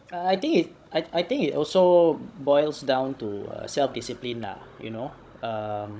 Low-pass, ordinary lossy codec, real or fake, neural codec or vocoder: none; none; fake; codec, 16 kHz, 16 kbps, FunCodec, trained on Chinese and English, 50 frames a second